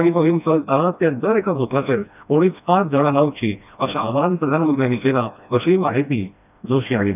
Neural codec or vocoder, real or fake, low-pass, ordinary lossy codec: codec, 16 kHz, 1 kbps, FreqCodec, smaller model; fake; 3.6 kHz; none